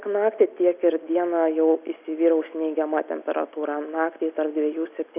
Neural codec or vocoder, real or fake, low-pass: none; real; 3.6 kHz